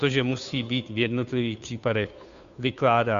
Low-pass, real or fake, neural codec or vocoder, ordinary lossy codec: 7.2 kHz; fake; codec, 16 kHz, 2 kbps, FunCodec, trained on Chinese and English, 25 frames a second; MP3, 96 kbps